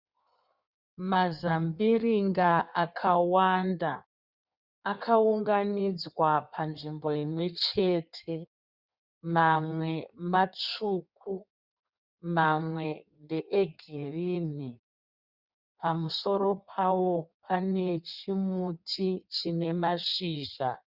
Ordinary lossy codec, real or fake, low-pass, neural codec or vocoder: Opus, 64 kbps; fake; 5.4 kHz; codec, 16 kHz in and 24 kHz out, 1.1 kbps, FireRedTTS-2 codec